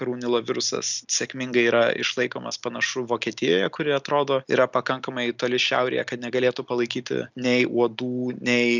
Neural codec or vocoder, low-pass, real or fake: none; 7.2 kHz; real